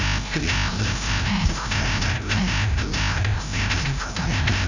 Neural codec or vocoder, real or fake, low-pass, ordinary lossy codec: codec, 16 kHz, 0.5 kbps, FreqCodec, larger model; fake; 7.2 kHz; none